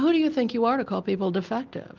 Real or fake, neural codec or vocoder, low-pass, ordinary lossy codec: real; none; 7.2 kHz; Opus, 24 kbps